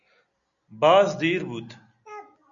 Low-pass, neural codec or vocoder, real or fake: 7.2 kHz; none; real